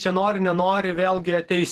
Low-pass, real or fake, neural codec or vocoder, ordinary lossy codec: 14.4 kHz; fake; vocoder, 48 kHz, 128 mel bands, Vocos; Opus, 16 kbps